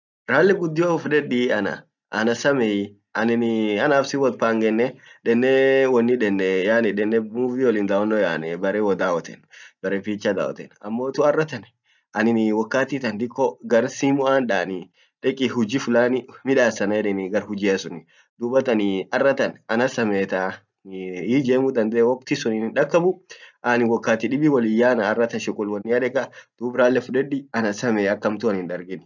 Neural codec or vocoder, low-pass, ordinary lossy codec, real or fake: none; 7.2 kHz; none; real